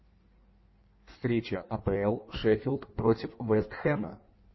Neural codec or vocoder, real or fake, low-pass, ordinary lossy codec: codec, 16 kHz in and 24 kHz out, 1.1 kbps, FireRedTTS-2 codec; fake; 7.2 kHz; MP3, 24 kbps